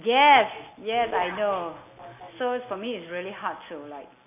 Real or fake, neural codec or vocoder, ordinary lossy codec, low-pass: real; none; MP3, 24 kbps; 3.6 kHz